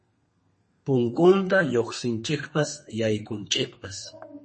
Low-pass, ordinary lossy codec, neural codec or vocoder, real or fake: 10.8 kHz; MP3, 32 kbps; codec, 32 kHz, 1.9 kbps, SNAC; fake